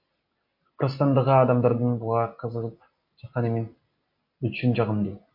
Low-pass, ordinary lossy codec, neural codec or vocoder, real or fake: 5.4 kHz; MP3, 32 kbps; none; real